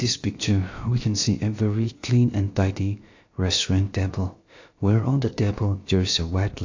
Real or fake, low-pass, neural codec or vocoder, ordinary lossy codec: fake; 7.2 kHz; codec, 16 kHz, about 1 kbps, DyCAST, with the encoder's durations; AAC, 48 kbps